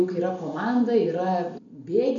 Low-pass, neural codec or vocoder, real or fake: 7.2 kHz; none; real